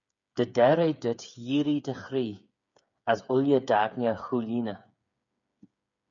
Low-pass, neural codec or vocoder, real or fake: 7.2 kHz; codec, 16 kHz, 8 kbps, FreqCodec, smaller model; fake